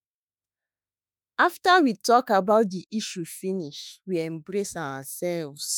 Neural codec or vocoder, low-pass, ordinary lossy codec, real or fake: autoencoder, 48 kHz, 32 numbers a frame, DAC-VAE, trained on Japanese speech; none; none; fake